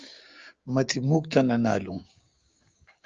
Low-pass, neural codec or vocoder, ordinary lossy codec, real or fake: 7.2 kHz; codec, 16 kHz, 6 kbps, DAC; Opus, 32 kbps; fake